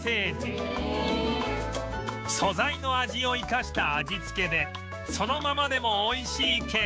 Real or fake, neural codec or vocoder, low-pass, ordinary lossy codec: fake; codec, 16 kHz, 6 kbps, DAC; none; none